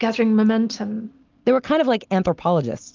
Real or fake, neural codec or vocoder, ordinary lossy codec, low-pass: real; none; Opus, 24 kbps; 7.2 kHz